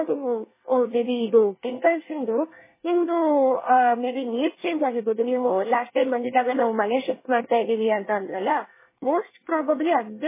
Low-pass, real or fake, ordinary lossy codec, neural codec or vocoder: 3.6 kHz; fake; MP3, 16 kbps; codec, 24 kHz, 1 kbps, SNAC